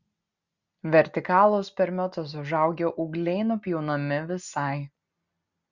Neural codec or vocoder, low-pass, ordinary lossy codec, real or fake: none; 7.2 kHz; Opus, 64 kbps; real